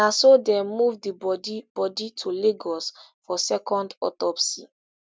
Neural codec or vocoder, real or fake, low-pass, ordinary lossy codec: none; real; none; none